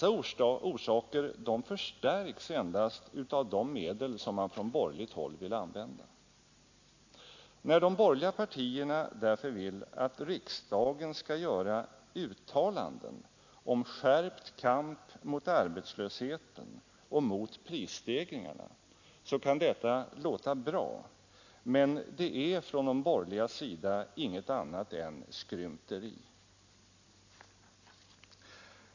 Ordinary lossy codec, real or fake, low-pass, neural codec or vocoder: AAC, 48 kbps; real; 7.2 kHz; none